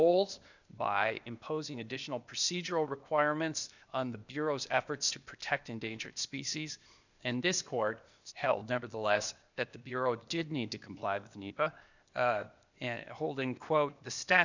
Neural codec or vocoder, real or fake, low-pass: codec, 16 kHz, 0.8 kbps, ZipCodec; fake; 7.2 kHz